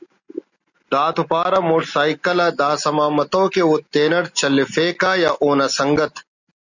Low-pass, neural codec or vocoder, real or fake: 7.2 kHz; none; real